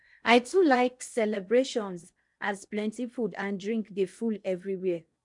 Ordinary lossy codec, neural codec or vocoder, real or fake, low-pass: none; codec, 16 kHz in and 24 kHz out, 0.6 kbps, FocalCodec, streaming, 4096 codes; fake; 10.8 kHz